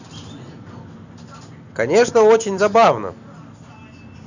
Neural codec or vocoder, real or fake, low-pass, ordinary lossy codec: none; real; 7.2 kHz; none